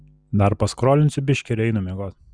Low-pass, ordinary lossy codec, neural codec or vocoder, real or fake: 9.9 kHz; Opus, 64 kbps; none; real